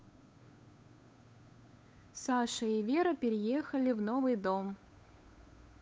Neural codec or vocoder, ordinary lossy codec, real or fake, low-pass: codec, 16 kHz, 8 kbps, FunCodec, trained on Chinese and English, 25 frames a second; none; fake; none